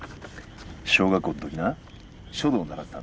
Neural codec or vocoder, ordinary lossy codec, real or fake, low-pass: none; none; real; none